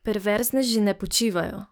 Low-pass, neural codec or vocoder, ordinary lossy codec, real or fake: none; codec, 44.1 kHz, 7.8 kbps, DAC; none; fake